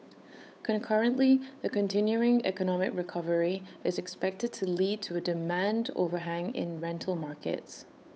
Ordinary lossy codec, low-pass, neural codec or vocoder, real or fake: none; none; codec, 16 kHz, 8 kbps, FunCodec, trained on Chinese and English, 25 frames a second; fake